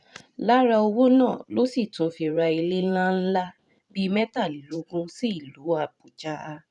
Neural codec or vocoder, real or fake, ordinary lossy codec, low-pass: vocoder, 44.1 kHz, 128 mel bands every 512 samples, BigVGAN v2; fake; none; 10.8 kHz